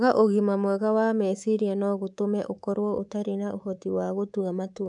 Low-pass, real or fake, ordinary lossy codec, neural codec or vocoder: 10.8 kHz; fake; none; codec, 24 kHz, 3.1 kbps, DualCodec